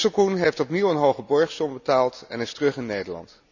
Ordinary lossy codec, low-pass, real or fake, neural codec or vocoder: none; 7.2 kHz; real; none